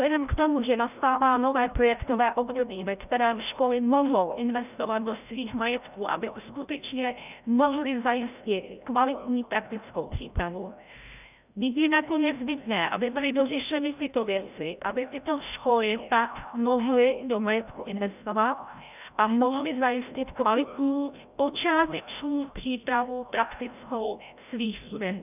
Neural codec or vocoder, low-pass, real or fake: codec, 16 kHz, 0.5 kbps, FreqCodec, larger model; 3.6 kHz; fake